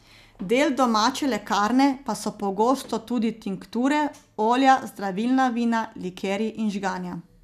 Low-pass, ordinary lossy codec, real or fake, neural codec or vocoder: 14.4 kHz; none; real; none